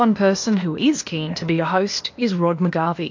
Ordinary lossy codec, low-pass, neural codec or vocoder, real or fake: MP3, 48 kbps; 7.2 kHz; codec, 16 kHz, 0.8 kbps, ZipCodec; fake